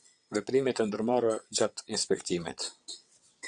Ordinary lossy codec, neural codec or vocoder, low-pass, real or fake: Opus, 64 kbps; vocoder, 22.05 kHz, 80 mel bands, WaveNeXt; 9.9 kHz; fake